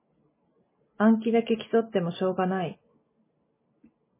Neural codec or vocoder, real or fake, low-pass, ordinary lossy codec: none; real; 3.6 kHz; MP3, 16 kbps